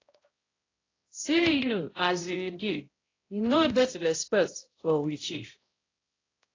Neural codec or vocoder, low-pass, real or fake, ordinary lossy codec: codec, 16 kHz, 0.5 kbps, X-Codec, HuBERT features, trained on balanced general audio; 7.2 kHz; fake; AAC, 32 kbps